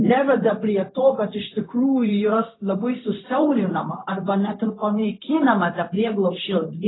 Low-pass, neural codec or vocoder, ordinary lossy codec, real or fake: 7.2 kHz; codec, 16 kHz, 0.4 kbps, LongCat-Audio-Codec; AAC, 16 kbps; fake